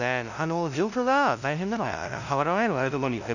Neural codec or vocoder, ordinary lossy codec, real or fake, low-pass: codec, 16 kHz, 0.5 kbps, FunCodec, trained on LibriTTS, 25 frames a second; none; fake; 7.2 kHz